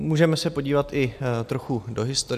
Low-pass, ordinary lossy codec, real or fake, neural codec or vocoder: 14.4 kHz; AAC, 96 kbps; real; none